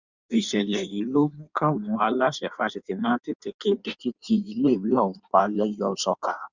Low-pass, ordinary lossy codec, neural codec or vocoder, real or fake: 7.2 kHz; Opus, 64 kbps; codec, 16 kHz in and 24 kHz out, 1.1 kbps, FireRedTTS-2 codec; fake